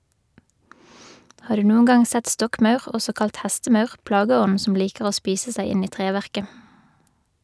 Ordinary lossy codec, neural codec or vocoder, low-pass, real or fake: none; none; none; real